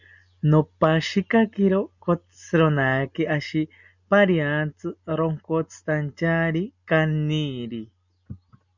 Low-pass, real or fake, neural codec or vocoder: 7.2 kHz; real; none